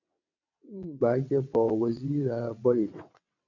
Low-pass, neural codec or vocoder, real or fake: 7.2 kHz; vocoder, 22.05 kHz, 80 mel bands, WaveNeXt; fake